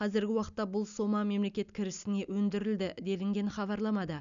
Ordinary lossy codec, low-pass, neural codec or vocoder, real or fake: none; 7.2 kHz; none; real